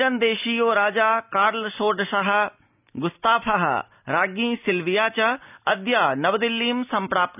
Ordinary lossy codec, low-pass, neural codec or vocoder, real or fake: none; 3.6 kHz; none; real